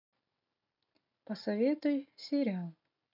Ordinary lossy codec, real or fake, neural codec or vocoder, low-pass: MP3, 48 kbps; real; none; 5.4 kHz